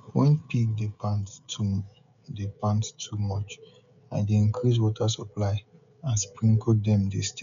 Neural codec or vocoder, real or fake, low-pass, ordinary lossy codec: codec, 16 kHz, 16 kbps, FreqCodec, smaller model; fake; 7.2 kHz; none